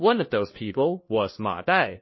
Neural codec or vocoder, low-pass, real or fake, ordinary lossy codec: codec, 16 kHz, 1 kbps, FunCodec, trained on LibriTTS, 50 frames a second; 7.2 kHz; fake; MP3, 24 kbps